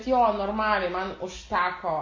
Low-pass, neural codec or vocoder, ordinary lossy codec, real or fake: 7.2 kHz; none; AAC, 32 kbps; real